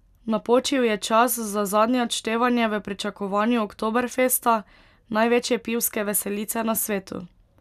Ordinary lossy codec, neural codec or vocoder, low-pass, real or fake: none; none; 14.4 kHz; real